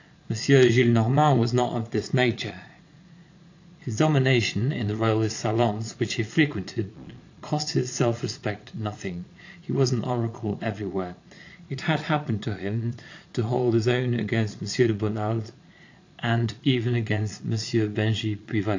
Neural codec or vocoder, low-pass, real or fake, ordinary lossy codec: vocoder, 22.05 kHz, 80 mel bands, Vocos; 7.2 kHz; fake; AAC, 48 kbps